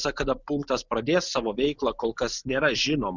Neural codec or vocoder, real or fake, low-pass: none; real; 7.2 kHz